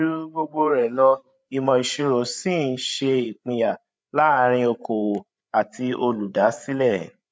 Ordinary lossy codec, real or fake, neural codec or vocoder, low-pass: none; fake; codec, 16 kHz, 8 kbps, FreqCodec, larger model; none